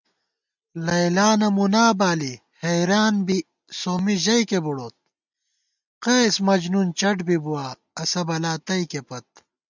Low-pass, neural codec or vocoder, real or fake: 7.2 kHz; none; real